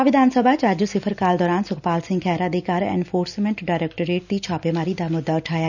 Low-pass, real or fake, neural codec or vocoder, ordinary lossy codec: 7.2 kHz; real; none; none